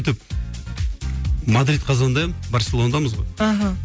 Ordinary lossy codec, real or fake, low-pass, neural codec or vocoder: none; real; none; none